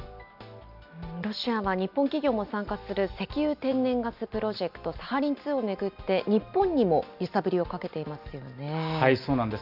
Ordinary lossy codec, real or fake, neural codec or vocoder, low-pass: none; real; none; 5.4 kHz